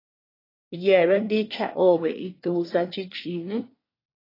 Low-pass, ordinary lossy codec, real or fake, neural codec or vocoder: 5.4 kHz; AAC, 24 kbps; fake; codec, 24 kHz, 1 kbps, SNAC